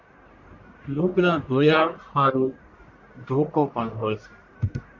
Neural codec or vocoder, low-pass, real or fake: codec, 44.1 kHz, 1.7 kbps, Pupu-Codec; 7.2 kHz; fake